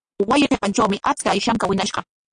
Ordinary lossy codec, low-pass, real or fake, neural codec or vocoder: AAC, 64 kbps; 9.9 kHz; real; none